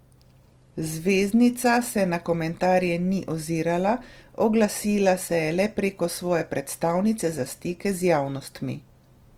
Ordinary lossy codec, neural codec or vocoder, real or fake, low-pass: Opus, 24 kbps; none; real; 19.8 kHz